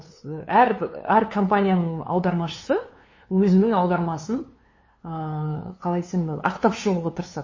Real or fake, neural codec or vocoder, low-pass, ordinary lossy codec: fake; codec, 16 kHz, 2 kbps, FunCodec, trained on LibriTTS, 25 frames a second; 7.2 kHz; MP3, 32 kbps